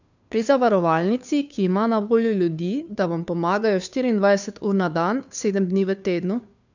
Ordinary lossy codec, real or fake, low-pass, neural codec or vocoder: none; fake; 7.2 kHz; codec, 16 kHz, 2 kbps, FunCodec, trained on Chinese and English, 25 frames a second